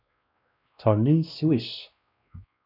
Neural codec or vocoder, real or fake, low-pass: codec, 16 kHz, 1 kbps, X-Codec, WavLM features, trained on Multilingual LibriSpeech; fake; 5.4 kHz